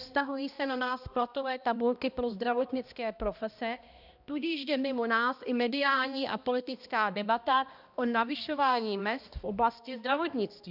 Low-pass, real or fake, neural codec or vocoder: 5.4 kHz; fake; codec, 16 kHz, 1 kbps, X-Codec, HuBERT features, trained on balanced general audio